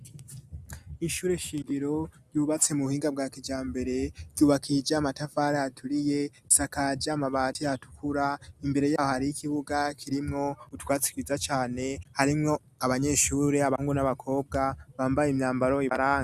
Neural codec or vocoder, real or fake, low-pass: none; real; 14.4 kHz